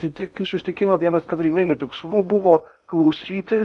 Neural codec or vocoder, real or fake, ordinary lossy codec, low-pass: codec, 16 kHz in and 24 kHz out, 0.8 kbps, FocalCodec, streaming, 65536 codes; fake; MP3, 96 kbps; 10.8 kHz